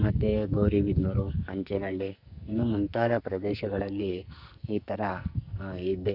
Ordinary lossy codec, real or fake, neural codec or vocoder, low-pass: none; fake; codec, 44.1 kHz, 2.6 kbps, SNAC; 5.4 kHz